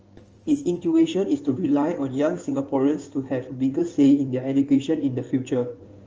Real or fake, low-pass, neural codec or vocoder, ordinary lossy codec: fake; 7.2 kHz; codec, 16 kHz in and 24 kHz out, 2.2 kbps, FireRedTTS-2 codec; Opus, 24 kbps